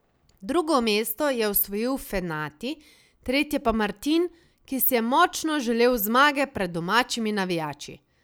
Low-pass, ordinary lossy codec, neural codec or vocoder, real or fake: none; none; none; real